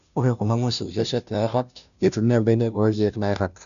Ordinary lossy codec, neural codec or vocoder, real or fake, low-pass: none; codec, 16 kHz, 0.5 kbps, FunCodec, trained on Chinese and English, 25 frames a second; fake; 7.2 kHz